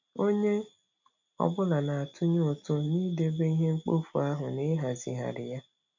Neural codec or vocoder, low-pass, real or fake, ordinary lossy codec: none; 7.2 kHz; real; none